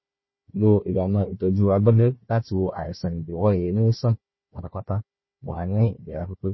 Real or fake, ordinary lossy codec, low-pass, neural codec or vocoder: fake; MP3, 24 kbps; 7.2 kHz; codec, 16 kHz, 1 kbps, FunCodec, trained on Chinese and English, 50 frames a second